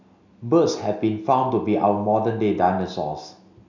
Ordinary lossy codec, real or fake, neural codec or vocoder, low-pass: none; real; none; 7.2 kHz